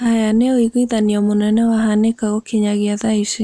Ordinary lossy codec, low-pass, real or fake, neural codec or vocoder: none; none; real; none